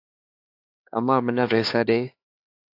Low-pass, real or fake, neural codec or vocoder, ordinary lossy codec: 5.4 kHz; fake; codec, 16 kHz, 2 kbps, X-Codec, HuBERT features, trained on LibriSpeech; AAC, 32 kbps